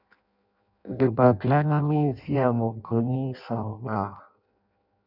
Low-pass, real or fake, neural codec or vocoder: 5.4 kHz; fake; codec, 16 kHz in and 24 kHz out, 0.6 kbps, FireRedTTS-2 codec